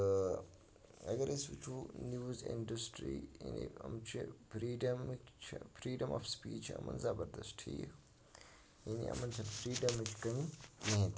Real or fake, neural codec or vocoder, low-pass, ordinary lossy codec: real; none; none; none